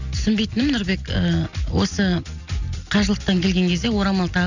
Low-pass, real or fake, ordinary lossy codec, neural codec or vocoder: 7.2 kHz; real; none; none